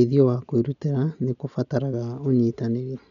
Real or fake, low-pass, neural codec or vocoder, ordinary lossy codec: real; 7.2 kHz; none; none